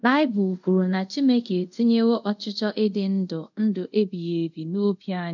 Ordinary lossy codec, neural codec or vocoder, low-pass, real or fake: none; codec, 24 kHz, 0.5 kbps, DualCodec; 7.2 kHz; fake